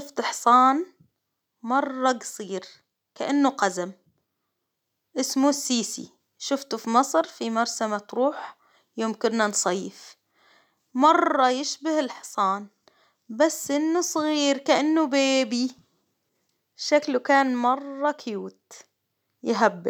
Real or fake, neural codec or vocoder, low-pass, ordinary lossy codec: real; none; 19.8 kHz; none